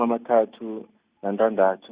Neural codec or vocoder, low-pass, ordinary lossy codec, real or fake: none; 3.6 kHz; Opus, 64 kbps; real